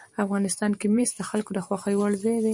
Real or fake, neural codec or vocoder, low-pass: fake; vocoder, 44.1 kHz, 128 mel bands every 512 samples, BigVGAN v2; 10.8 kHz